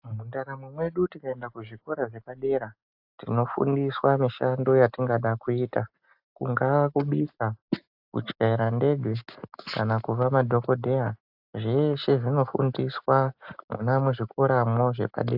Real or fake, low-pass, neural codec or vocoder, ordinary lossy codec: real; 5.4 kHz; none; AAC, 48 kbps